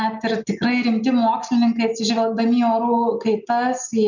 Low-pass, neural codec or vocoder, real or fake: 7.2 kHz; none; real